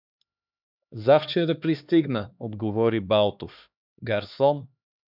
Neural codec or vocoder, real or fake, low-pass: codec, 16 kHz, 2 kbps, X-Codec, HuBERT features, trained on LibriSpeech; fake; 5.4 kHz